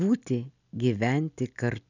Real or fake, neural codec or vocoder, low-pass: real; none; 7.2 kHz